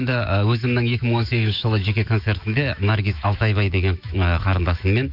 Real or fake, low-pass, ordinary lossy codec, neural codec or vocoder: fake; 5.4 kHz; none; autoencoder, 48 kHz, 128 numbers a frame, DAC-VAE, trained on Japanese speech